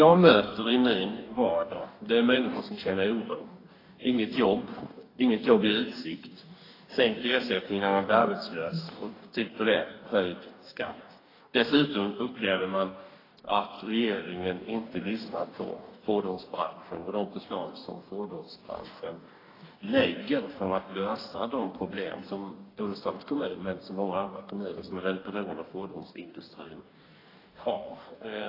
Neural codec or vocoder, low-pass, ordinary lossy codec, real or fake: codec, 44.1 kHz, 2.6 kbps, DAC; 5.4 kHz; AAC, 24 kbps; fake